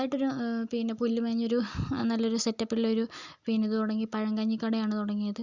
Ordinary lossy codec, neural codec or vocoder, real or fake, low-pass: Opus, 64 kbps; none; real; 7.2 kHz